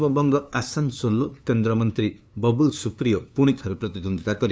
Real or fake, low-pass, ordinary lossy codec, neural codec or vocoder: fake; none; none; codec, 16 kHz, 2 kbps, FunCodec, trained on LibriTTS, 25 frames a second